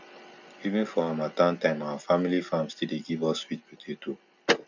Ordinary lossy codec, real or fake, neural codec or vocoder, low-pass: none; real; none; 7.2 kHz